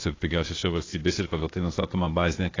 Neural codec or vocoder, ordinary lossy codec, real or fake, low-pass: codec, 16 kHz, 0.8 kbps, ZipCodec; AAC, 32 kbps; fake; 7.2 kHz